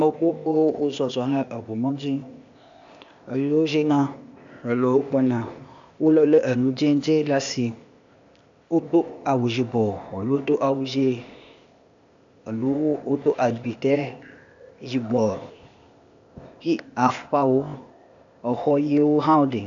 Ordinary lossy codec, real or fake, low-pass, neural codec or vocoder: AAC, 64 kbps; fake; 7.2 kHz; codec, 16 kHz, 0.8 kbps, ZipCodec